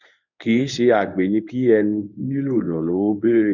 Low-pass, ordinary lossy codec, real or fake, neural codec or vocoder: 7.2 kHz; none; fake; codec, 24 kHz, 0.9 kbps, WavTokenizer, medium speech release version 1